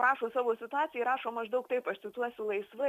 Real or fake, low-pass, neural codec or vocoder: real; 14.4 kHz; none